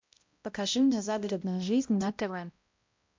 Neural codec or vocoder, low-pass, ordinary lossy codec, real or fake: codec, 16 kHz, 0.5 kbps, X-Codec, HuBERT features, trained on balanced general audio; 7.2 kHz; MP3, 64 kbps; fake